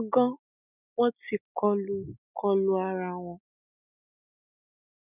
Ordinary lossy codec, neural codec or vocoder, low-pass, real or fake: none; none; 3.6 kHz; real